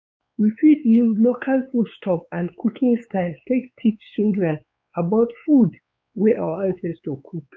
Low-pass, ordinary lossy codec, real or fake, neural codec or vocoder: none; none; fake; codec, 16 kHz, 4 kbps, X-Codec, WavLM features, trained on Multilingual LibriSpeech